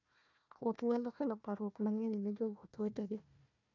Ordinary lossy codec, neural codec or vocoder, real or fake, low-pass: none; codec, 16 kHz, 1 kbps, FunCodec, trained on Chinese and English, 50 frames a second; fake; 7.2 kHz